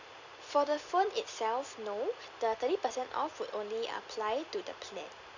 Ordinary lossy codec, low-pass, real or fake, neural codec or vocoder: none; 7.2 kHz; real; none